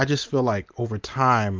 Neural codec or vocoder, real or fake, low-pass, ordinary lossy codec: none; real; 7.2 kHz; Opus, 32 kbps